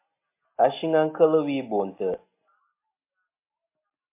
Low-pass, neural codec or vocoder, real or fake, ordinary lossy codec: 3.6 kHz; none; real; MP3, 24 kbps